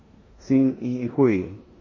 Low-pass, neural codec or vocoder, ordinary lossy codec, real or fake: 7.2 kHz; codec, 16 kHz in and 24 kHz out, 0.9 kbps, LongCat-Audio-Codec, four codebook decoder; MP3, 32 kbps; fake